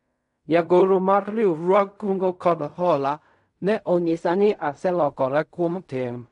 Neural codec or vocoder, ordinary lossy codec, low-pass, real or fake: codec, 16 kHz in and 24 kHz out, 0.4 kbps, LongCat-Audio-Codec, fine tuned four codebook decoder; MP3, 96 kbps; 10.8 kHz; fake